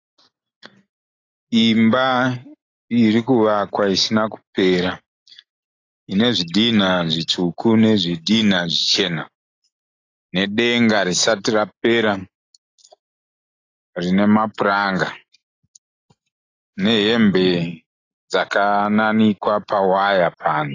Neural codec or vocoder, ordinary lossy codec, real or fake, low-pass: none; AAC, 32 kbps; real; 7.2 kHz